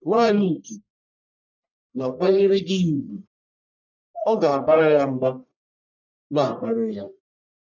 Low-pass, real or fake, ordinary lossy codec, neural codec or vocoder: 7.2 kHz; fake; none; codec, 44.1 kHz, 1.7 kbps, Pupu-Codec